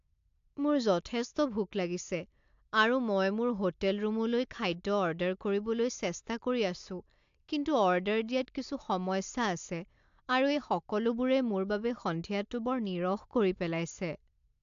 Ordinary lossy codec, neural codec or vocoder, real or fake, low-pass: AAC, 64 kbps; none; real; 7.2 kHz